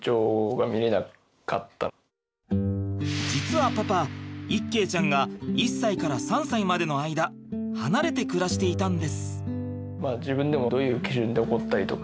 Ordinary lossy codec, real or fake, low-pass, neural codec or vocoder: none; real; none; none